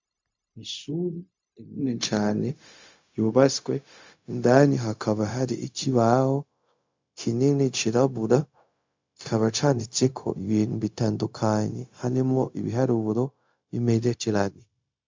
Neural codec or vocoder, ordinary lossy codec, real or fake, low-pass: codec, 16 kHz, 0.4 kbps, LongCat-Audio-Codec; MP3, 64 kbps; fake; 7.2 kHz